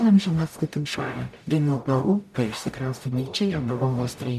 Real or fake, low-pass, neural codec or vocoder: fake; 14.4 kHz; codec, 44.1 kHz, 0.9 kbps, DAC